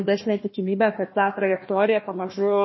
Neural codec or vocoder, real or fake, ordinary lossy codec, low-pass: codec, 16 kHz, 1 kbps, FunCodec, trained on Chinese and English, 50 frames a second; fake; MP3, 24 kbps; 7.2 kHz